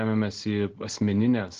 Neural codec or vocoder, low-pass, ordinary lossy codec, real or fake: none; 7.2 kHz; Opus, 16 kbps; real